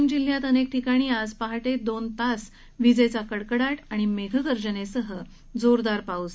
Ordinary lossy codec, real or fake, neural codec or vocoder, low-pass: none; real; none; none